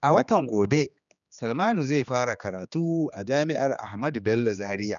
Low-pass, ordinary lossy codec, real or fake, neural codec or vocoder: 7.2 kHz; none; fake; codec, 16 kHz, 2 kbps, X-Codec, HuBERT features, trained on general audio